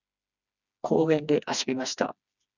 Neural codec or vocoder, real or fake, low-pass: codec, 16 kHz, 2 kbps, FreqCodec, smaller model; fake; 7.2 kHz